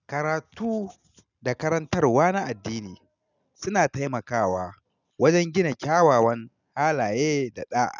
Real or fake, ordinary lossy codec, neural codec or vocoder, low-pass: real; none; none; 7.2 kHz